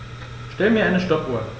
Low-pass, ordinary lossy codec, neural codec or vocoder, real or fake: none; none; none; real